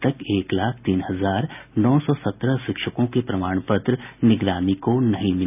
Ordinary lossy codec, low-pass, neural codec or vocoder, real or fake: none; 3.6 kHz; none; real